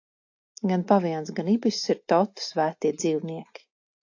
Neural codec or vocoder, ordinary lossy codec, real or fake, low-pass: none; AAC, 48 kbps; real; 7.2 kHz